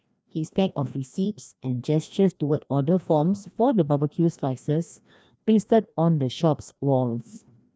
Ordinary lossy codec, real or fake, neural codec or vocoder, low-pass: none; fake; codec, 16 kHz, 1 kbps, FreqCodec, larger model; none